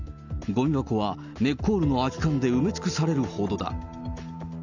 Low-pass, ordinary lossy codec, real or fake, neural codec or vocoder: 7.2 kHz; none; real; none